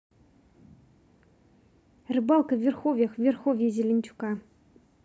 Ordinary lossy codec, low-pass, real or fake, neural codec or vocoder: none; none; real; none